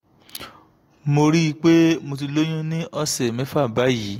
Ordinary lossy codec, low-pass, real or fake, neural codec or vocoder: AAC, 48 kbps; 19.8 kHz; real; none